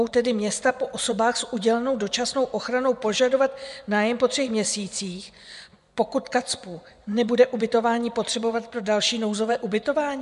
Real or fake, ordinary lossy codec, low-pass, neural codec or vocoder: fake; MP3, 96 kbps; 10.8 kHz; vocoder, 24 kHz, 100 mel bands, Vocos